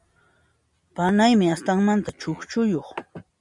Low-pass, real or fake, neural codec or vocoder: 10.8 kHz; real; none